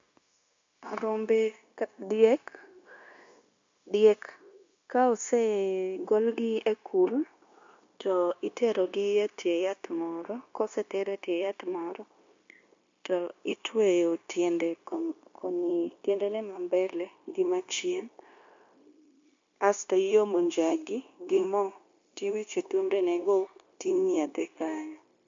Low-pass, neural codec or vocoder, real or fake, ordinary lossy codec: 7.2 kHz; codec, 16 kHz, 0.9 kbps, LongCat-Audio-Codec; fake; MP3, 48 kbps